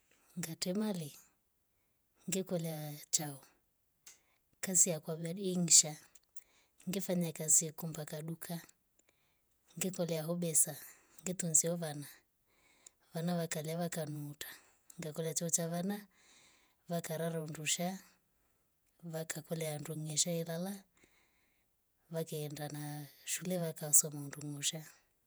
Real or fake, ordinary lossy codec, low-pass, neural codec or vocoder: fake; none; none; vocoder, 48 kHz, 128 mel bands, Vocos